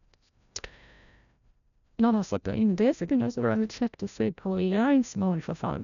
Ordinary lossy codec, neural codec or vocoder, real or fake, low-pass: none; codec, 16 kHz, 0.5 kbps, FreqCodec, larger model; fake; 7.2 kHz